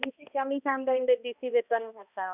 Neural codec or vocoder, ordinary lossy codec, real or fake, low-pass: codec, 16 kHz, 1 kbps, X-Codec, HuBERT features, trained on balanced general audio; none; fake; 3.6 kHz